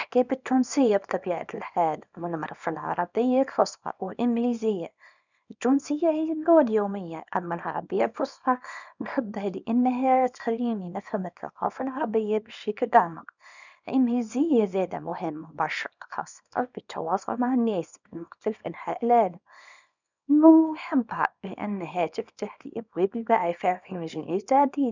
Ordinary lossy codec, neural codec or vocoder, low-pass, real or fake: none; codec, 24 kHz, 0.9 kbps, WavTokenizer, small release; 7.2 kHz; fake